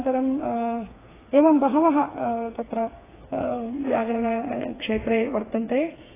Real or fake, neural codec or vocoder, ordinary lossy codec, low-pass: fake; codec, 16 kHz, 4 kbps, FreqCodec, smaller model; AAC, 16 kbps; 3.6 kHz